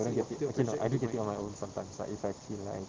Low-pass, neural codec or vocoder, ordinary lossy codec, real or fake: 7.2 kHz; none; Opus, 16 kbps; real